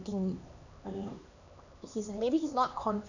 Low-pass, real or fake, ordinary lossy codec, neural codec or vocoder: 7.2 kHz; fake; none; codec, 16 kHz, 2 kbps, X-Codec, HuBERT features, trained on LibriSpeech